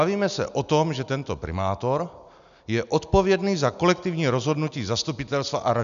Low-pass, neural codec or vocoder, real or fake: 7.2 kHz; none; real